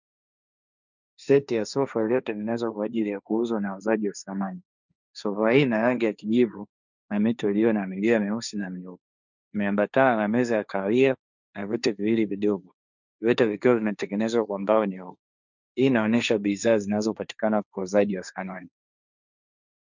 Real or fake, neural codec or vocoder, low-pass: fake; codec, 16 kHz, 1.1 kbps, Voila-Tokenizer; 7.2 kHz